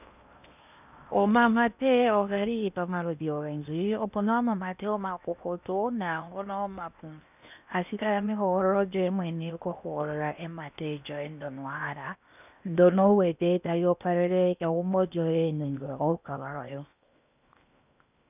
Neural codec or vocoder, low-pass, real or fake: codec, 16 kHz in and 24 kHz out, 0.8 kbps, FocalCodec, streaming, 65536 codes; 3.6 kHz; fake